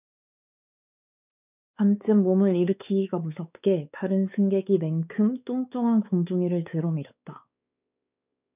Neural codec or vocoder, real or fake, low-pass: codec, 16 kHz, 4 kbps, X-Codec, WavLM features, trained on Multilingual LibriSpeech; fake; 3.6 kHz